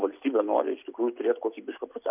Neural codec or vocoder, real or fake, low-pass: none; real; 3.6 kHz